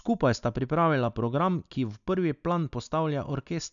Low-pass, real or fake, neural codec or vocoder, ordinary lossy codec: 7.2 kHz; real; none; none